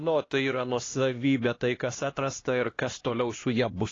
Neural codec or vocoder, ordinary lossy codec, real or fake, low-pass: codec, 16 kHz, 1 kbps, X-Codec, HuBERT features, trained on LibriSpeech; AAC, 32 kbps; fake; 7.2 kHz